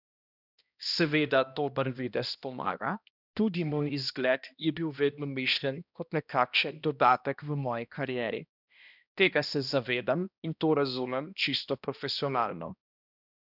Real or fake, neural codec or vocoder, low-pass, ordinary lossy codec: fake; codec, 16 kHz, 1 kbps, X-Codec, HuBERT features, trained on balanced general audio; 5.4 kHz; none